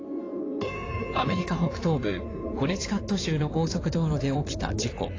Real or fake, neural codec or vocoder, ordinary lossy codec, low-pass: fake; codec, 16 kHz in and 24 kHz out, 2.2 kbps, FireRedTTS-2 codec; AAC, 32 kbps; 7.2 kHz